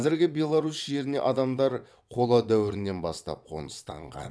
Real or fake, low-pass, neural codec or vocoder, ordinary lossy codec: fake; none; vocoder, 22.05 kHz, 80 mel bands, WaveNeXt; none